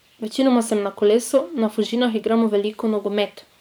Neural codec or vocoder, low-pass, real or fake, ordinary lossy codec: vocoder, 44.1 kHz, 128 mel bands every 512 samples, BigVGAN v2; none; fake; none